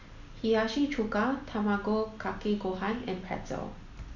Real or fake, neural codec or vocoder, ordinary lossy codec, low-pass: real; none; none; 7.2 kHz